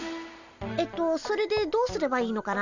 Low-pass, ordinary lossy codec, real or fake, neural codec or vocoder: 7.2 kHz; none; real; none